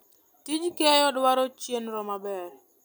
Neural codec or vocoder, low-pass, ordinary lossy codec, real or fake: none; none; none; real